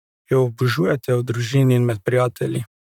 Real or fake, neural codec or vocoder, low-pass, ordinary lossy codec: fake; vocoder, 44.1 kHz, 128 mel bands, Pupu-Vocoder; 19.8 kHz; none